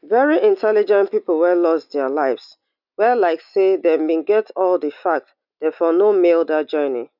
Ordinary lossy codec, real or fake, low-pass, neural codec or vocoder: none; real; 5.4 kHz; none